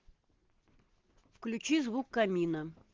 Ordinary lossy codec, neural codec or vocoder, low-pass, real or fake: Opus, 16 kbps; none; 7.2 kHz; real